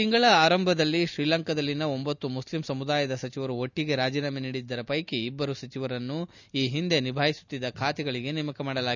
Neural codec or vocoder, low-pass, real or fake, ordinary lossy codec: none; 7.2 kHz; real; none